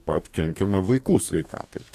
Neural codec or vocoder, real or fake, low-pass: codec, 44.1 kHz, 2.6 kbps, DAC; fake; 14.4 kHz